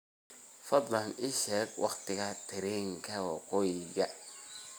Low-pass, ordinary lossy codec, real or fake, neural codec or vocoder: none; none; real; none